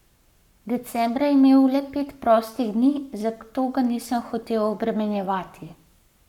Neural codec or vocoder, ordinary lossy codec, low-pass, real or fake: codec, 44.1 kHz, 7.8 kbps, Pupu-Codec; none; 19.8 kHz; fake